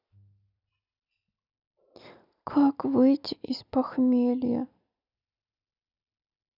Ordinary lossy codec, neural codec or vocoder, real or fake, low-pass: none; none; real; 5.4 kHz